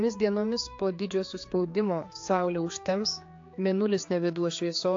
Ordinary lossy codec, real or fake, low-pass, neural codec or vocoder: AAC, 48 kbps; fake; 7.2 kHz; codec, 16 kHz, 4 kbps, X-Codec, HuBERT features, trained on general audio